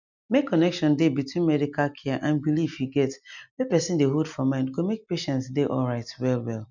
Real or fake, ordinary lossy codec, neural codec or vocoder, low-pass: real; none; none; 7.2 kHz